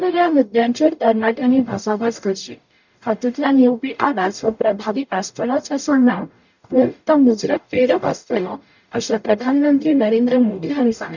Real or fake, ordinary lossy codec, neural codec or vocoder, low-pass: fake; none; codec, 44.1 kHz, 0.9 kbps, DAC; 7.2 kHz